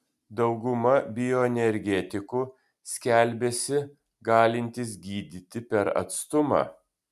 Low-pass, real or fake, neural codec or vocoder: 14.4 kHz; real; none